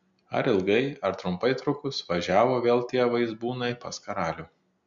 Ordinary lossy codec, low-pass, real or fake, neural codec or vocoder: MP3, 64 kbps; 7.2 kHz; real; none